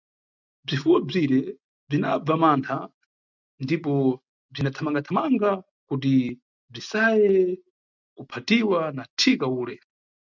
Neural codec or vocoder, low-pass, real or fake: none; 7.2 kHz; real